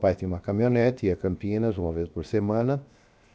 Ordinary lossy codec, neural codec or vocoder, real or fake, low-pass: none; codec, 16 kHz, 0.7 kbps, FocalCodec; fake; none